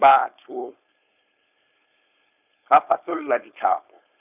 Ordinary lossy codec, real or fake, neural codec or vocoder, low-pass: none; fake; codec, 16 kHz, 4.8 kbps, FACodec; 3.6 kHz